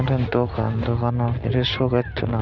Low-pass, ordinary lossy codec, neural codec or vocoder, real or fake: 7.2 kHz; none; none; real